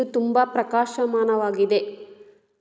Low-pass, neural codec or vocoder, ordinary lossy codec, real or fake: none; none; none; real